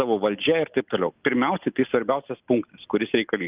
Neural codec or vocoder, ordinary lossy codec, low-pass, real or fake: none; Opus, 24 kbps; 3.6 kHz; real